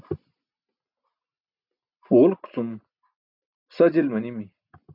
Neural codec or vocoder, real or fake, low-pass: none; real; 5.4 kHz